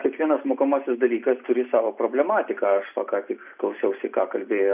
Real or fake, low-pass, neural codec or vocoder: real; 3.6 kHz; none